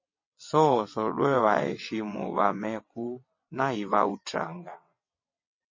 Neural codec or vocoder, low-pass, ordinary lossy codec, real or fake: vocoder, 22.05 kHz, 80 mel bands, WaveNeXt; 7.2 kHz; MP3, 32 kbps; fake